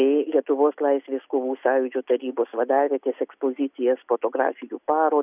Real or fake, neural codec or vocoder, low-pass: real; none; 3.6 kHz